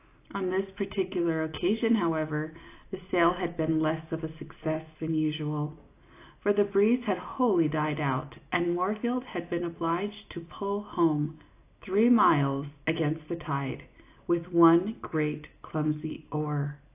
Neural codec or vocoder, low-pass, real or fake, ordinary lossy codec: none; 3.6 kHz; real; AAC, 24 kbps